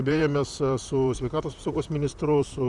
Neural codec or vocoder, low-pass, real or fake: vocoder, 44.1 kHz, 128 mel bands, Pupu-Vocoder; 10.8 kHz; fake